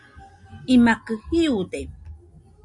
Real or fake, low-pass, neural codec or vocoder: real; 10.8 kHz; none